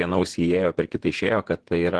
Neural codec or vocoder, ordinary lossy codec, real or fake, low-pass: vocoder, 48 kHz, 128 mel bands, Vocos; Opus, 16 kbps; fake; 10.8 kHz